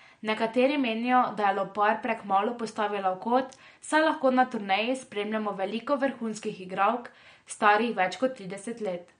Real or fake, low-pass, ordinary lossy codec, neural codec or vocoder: real; 9.9 kHz; MP3, 48 kbps; none